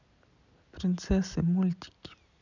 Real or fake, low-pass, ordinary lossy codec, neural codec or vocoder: real; 7.2 kHz; none; none